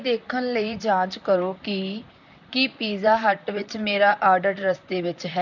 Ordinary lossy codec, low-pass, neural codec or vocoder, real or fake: none; 7.2 kHz; vocoder, 44.1 kHz, 128 mel bands, Pupu-Vocoder; fake